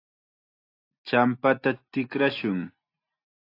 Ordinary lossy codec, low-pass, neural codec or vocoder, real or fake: AAC, 32 kbps; 5.4 kHz; none; real